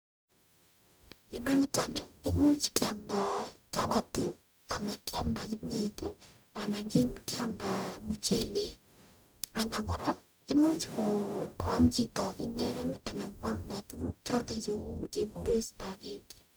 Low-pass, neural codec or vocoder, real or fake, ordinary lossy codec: none; codec, 44.1 kHz, 0.9 kbps, DAC; fake; none